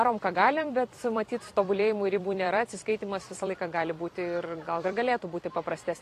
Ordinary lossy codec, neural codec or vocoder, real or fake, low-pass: AAC, 48 kbps; vocoder, 44.1 kHz, 128 mel bands every 256 samples, BigVGAN v2; fake; 14.4 kHz